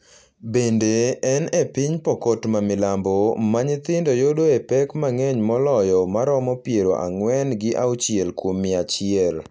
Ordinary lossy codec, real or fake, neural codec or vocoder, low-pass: none; real; none; none